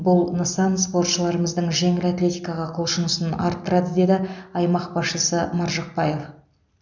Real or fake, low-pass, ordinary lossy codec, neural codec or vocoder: real; 7.2 kHz; none; none